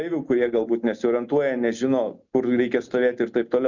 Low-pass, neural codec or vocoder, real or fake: 7.2 kHz; none; real